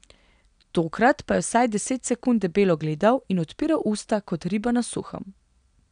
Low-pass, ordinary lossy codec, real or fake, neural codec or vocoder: 9.9 kHz; none; real; none